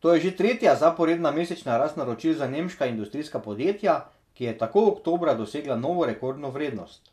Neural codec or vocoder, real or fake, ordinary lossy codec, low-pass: none; real; none; 14.4 kHz